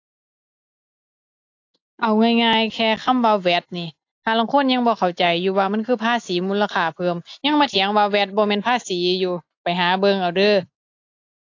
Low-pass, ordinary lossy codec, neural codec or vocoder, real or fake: 7.2 kHz; AAC, 48 kbps; none; real